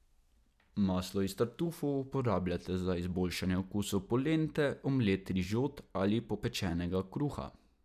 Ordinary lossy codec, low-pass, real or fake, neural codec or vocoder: none; 14.4 kHz; real; none